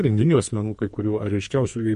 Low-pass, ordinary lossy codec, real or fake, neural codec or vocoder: 14.4 kHz; MP3, 48 kbps; fake; codec, 44.1 kHz, 2.6 kbps, SNAC